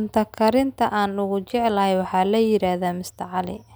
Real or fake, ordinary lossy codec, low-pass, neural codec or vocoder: real; none; none; none